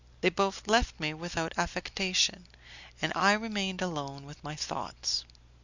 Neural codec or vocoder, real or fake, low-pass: none; real; 7.2 kHz